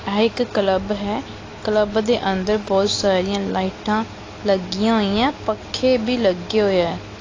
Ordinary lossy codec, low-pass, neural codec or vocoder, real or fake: AAC, 32 kbps; 7.2 kHz; none; real